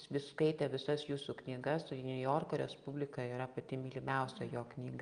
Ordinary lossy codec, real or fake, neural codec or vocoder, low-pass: Opus, 32 kbps; real; none; 9.9 kHz